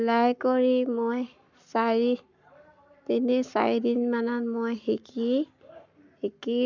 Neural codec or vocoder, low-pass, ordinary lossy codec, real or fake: codec, 44.1 kHz, 7.8 kbps, Pupu-Codec; 7.2 kHz; none; fake